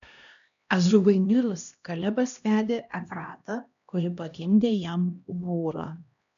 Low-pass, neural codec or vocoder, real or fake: 7.2 kHz; codec, 16 kHz, 1 kbps, X-Codec, HuBERT features, trained on LibriSpeech; fake